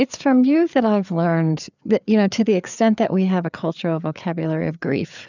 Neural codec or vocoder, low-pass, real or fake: codec, 16 kHz, 4 kbps, FreqCodec, larger model; 7.2 kHz; fake